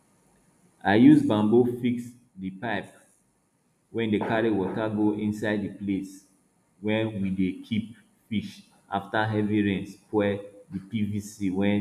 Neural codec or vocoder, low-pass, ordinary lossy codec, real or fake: none; 14.4 kHz; none; real